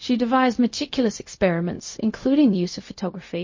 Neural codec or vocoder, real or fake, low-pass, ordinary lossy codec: codec, 16 kHz, 0.4 kbps, LongCat-Audio-Codec; fake; 7.2 kHz; MP3, 32 kbps